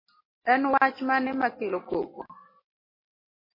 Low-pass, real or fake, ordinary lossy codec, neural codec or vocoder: 5.4 kHz; real; MP3, 24 kbps; none